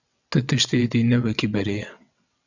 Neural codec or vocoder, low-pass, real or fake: vocoder, 22.05 kHz, 80 mel bands, WaveNeXt; 7.2 kHz; fake